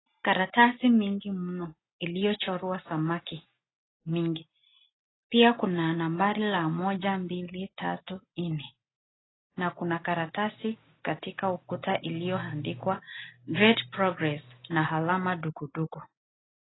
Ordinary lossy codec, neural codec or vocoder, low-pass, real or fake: AAC, 16 kbps; none; 7.2 kHz; real